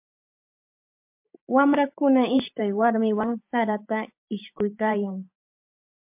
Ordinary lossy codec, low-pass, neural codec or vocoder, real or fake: MP3, 32 kbps; 3.6 kHz; vocoder, 44.1 kHz, 128 mel bands every 512 samples, BigVGAN v2; fake